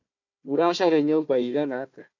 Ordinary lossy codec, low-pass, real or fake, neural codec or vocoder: MP3, 48 kbps; 7.2 kHz; fake; codec, 16 kHz, 1 kbps, FunCodec, trained on Chinese and English, 50 frames a second